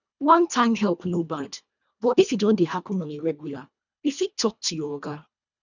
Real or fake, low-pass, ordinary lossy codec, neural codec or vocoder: fake; 7.2 kHz; none; codec, 24 kHz, 1.5 kbps, HILCodec